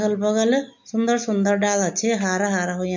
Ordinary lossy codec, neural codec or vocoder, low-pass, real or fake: MP3, 48 kbps; none; 7.2 kHz; real